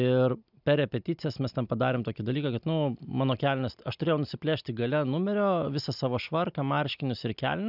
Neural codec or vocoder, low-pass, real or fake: none; 5.4 kHz; real